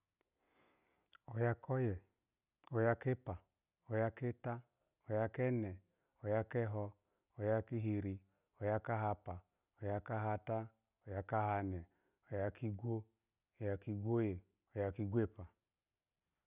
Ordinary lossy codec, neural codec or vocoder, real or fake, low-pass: none; none; real; 3.6 kHz